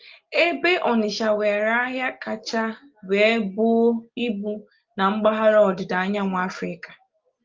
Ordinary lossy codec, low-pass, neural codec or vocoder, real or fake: Opus, 32 kbps; 7.2 kHz; none; real